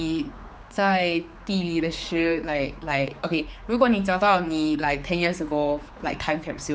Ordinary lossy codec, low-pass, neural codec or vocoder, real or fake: none; none; codec, 16 kHz, 4 kbps, X-Codec, HuBERT features, trained on general audio; fake